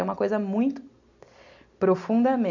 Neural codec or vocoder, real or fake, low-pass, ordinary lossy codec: none; real; 7.2 kHz; none